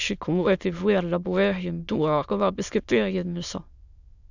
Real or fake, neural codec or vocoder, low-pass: fake; autoencoder, 22.05 kHz, a latent of 192 numbers a frame, VITS, trained on many speakers; 7.2 kHz